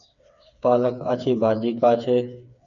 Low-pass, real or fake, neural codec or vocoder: 7.2 kHz; fake; codec, 16 kHz, 4 kbps, FreqCodec, smaller model